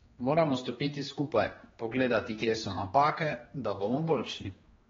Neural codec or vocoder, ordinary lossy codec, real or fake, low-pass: codec, 16 kHz, 2 kbps, X-Codec, HuBERT features, trained on general audio; AAC, 24 kbps; fake; 7.2 kHz